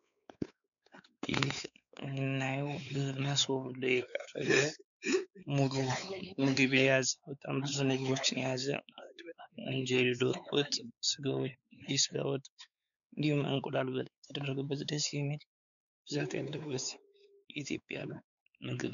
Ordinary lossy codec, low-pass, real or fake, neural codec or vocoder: MP3, 96 kbps; 7.2 kHz; fake; codec, 16 kHz, 4 kbps, X-Codec, WavLM features, trained on Multilingual LibriSpeech